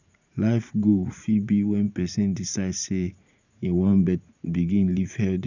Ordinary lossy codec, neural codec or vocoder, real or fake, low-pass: none; vocoder, 44.1 kHz, 80 mel bands, Vocos; fake; 7.2 kHz